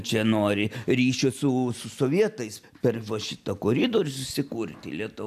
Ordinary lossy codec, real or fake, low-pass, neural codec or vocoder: AAC, 96 kbps; real; 14.4 kHz; none